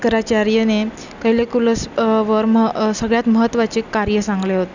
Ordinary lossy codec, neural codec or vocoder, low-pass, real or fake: none; none; 7.2 kHz; real